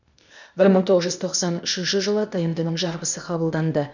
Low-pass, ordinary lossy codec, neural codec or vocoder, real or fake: 7.2 kHz; none; codec, 16 kHz, 0.8 kbps, ZipCodec; fake